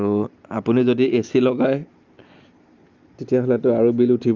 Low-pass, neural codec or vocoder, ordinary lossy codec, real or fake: 7.2 kHz; none; Opus, 24 kbps; real